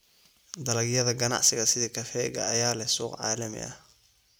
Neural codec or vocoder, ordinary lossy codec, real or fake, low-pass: none; none; real; none